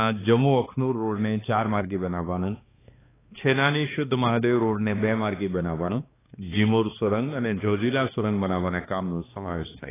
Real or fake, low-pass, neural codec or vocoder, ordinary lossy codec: fake; 3.6 kHz; codec, 16 kHz, 2 kbps, X-Codec, HuBERT features, trained on balanced general audio; AAC, 16 kbps